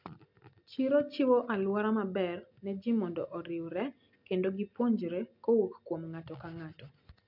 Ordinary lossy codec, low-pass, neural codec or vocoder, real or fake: MP3, 48 kbps; 5.4 kHz; none; real